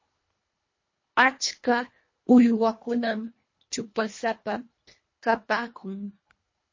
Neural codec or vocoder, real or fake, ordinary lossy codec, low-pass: codec, 24 kHz, 1.5 kbps, HILCodec; fake; MP3, 32 kbps; 7.2 kHz